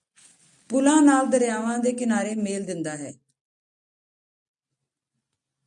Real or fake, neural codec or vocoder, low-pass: real; none; 10.8 kHz